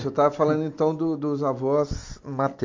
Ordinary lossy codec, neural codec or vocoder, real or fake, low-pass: MP3, 48 kbps; none; real; 7.2 kHz